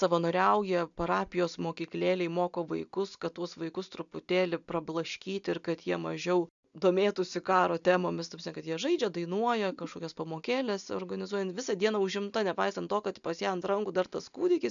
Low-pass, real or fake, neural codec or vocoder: 7.2 kHz; real; none